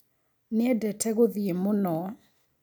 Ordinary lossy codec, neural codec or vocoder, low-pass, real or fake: none; none; none; real